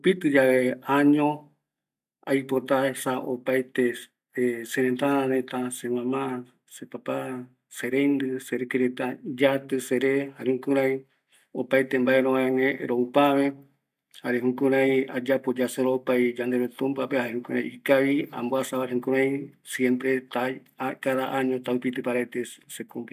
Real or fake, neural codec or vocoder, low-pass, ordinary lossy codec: real; none; 14.4 kHz; none